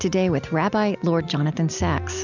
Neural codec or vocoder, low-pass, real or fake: none; 7.2 kHz; real